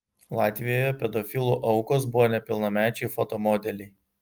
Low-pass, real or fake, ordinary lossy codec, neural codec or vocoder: 19.8 kHz; real; Opus, 32 kbps; none